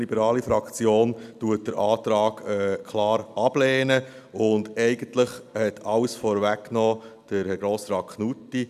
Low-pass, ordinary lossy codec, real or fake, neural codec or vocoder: 14.4 kHz; none; real; none